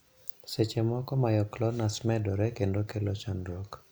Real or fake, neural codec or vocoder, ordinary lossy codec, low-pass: real; none; none; none